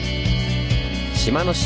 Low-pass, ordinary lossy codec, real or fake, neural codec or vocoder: none; none; real; none